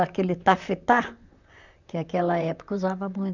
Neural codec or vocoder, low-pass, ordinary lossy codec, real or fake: vocoder, 44.1 kHz, 128 mel bands, Pupu-Vocoder; 7.2 kHz; none; fake